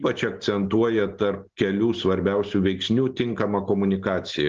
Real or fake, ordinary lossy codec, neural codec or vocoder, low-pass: real; Opus, 16 kbps; none; 7.2 kHz